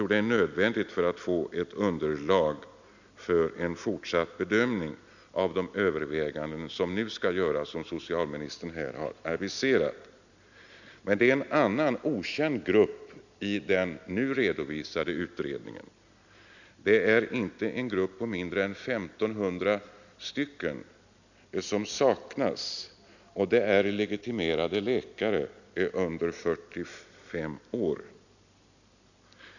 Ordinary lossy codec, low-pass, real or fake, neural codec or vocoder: none; 7.2 kHz; real; none